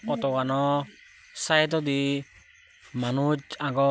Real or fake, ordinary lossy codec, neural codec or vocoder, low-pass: real; none; none; none